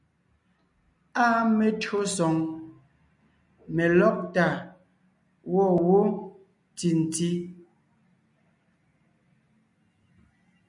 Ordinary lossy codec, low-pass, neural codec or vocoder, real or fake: MP3, 96 kbps; 10.8 kHz; none; real